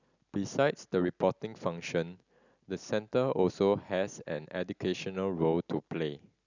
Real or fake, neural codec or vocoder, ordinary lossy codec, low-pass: real; none; none; 7.2 kHz